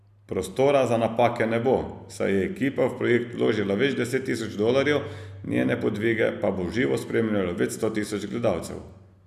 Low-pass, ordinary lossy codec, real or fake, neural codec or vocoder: 14.4 kHz; none; real; none